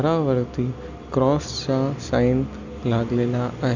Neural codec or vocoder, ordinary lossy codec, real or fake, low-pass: none; Opus, 64 kbps; real; 7.2 kHz